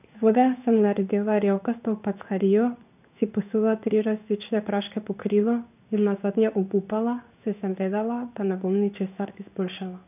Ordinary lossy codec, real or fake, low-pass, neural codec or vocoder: none; fake; 3.6 kHz; codec, 16 kHz in and 24 kHz out, 1 kbps, XY-Tokenizer